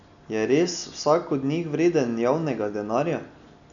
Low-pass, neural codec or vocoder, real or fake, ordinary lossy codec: 7.2 kHz; none; real; none